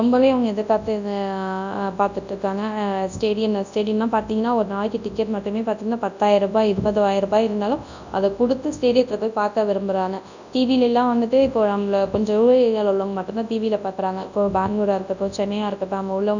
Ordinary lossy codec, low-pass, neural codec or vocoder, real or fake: none; 7.2 kHz; codec, 24 kHz, 0.9 kbps, WavTokenizer, large speech release; fake